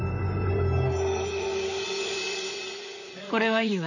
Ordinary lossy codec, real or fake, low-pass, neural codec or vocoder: none; fake; 7.2 kHz; vocoder, 44.1 kHz, 128 mel bands, Pupu-Vocoder